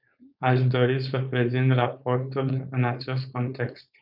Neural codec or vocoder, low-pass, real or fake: codec, 16 kHz, 4.8 kbps, FACodec; 5.4 kHz; fake